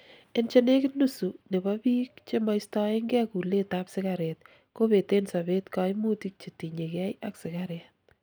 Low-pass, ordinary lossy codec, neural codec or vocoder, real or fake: none; none; none; real